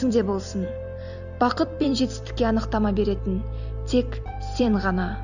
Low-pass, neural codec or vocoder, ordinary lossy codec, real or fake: 7.2 kHz; vocoder, 44.1 kHz, 128 mel bands every 256 samples, BigVGAN v2; none; fake